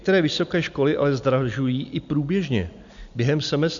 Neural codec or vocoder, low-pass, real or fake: none; 7.2 kHz; real